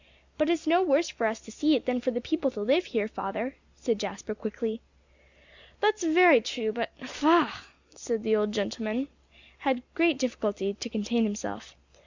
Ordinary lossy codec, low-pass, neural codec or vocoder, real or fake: Opus, 64 kbps; 7.2 kHz; none; real